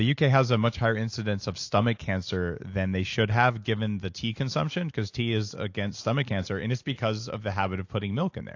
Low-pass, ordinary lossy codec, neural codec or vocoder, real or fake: 7.2 kHz; AAC, 48 kbps; none; real